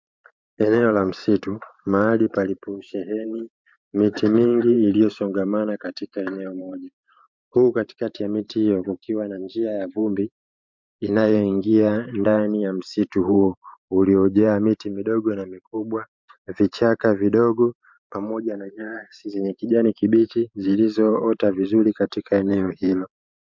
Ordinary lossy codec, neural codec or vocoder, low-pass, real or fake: MP3, 64 kbps; vocoder, 44.1 kHz, 128 mel bands every 512 samples, BigVGAN v2; 7.2 kHz; fake